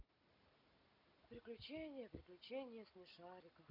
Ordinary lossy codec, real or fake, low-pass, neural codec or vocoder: none; real; 5.4 kHz; none